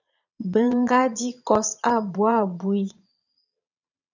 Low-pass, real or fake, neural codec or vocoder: 7.2 kHz; fake; vocoder, 22.05 kHz, 80 mel bands, Vocos